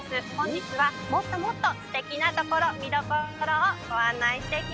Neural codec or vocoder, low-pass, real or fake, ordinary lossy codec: none; none; real; none